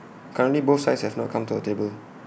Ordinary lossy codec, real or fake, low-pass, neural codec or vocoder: none; real; none; none